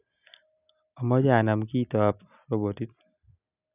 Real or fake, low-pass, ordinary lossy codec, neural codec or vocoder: real; 3.6 kHz; none; none